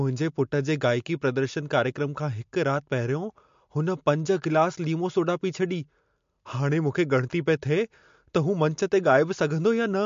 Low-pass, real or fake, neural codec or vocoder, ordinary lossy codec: 7.2 kHz; real; none; MP3, 48 kbps